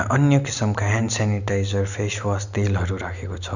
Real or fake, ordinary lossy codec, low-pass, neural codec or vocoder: real; none; 7.2 kHz; none